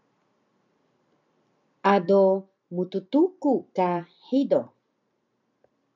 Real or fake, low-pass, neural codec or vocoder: fake; 7.2 kHz; vocoder, 44.1 kHz, 128 mel bands every 256 samples, BigVGAN v2